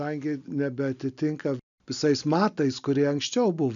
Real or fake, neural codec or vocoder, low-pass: real; none; 7.2 kHz